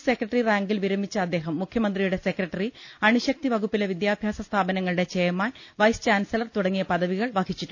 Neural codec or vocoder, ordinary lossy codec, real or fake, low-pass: none; none; real; 7.2 kHz